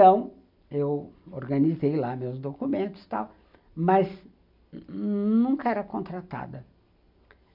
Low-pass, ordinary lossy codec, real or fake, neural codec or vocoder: 5.4 kHz; MP3, 48 kbps; real; none